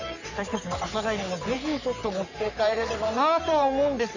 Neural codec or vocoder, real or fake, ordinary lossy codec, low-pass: codec, 44.1 kHz, 3.4 kbps, Pupu-Codec; fake; none; 7.2 kHz